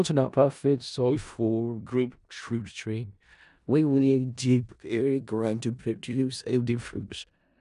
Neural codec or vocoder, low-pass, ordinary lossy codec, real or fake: codec, 16 kHz in and 24 kHz out, 0.4 kbps, LongCat-Audio-Codec, four codebook decoder; 10.8 kHz; none; fake